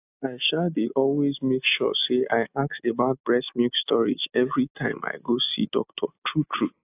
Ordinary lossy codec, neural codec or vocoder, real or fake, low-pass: AAC, 32 kbps; none; real; 3.6 kHz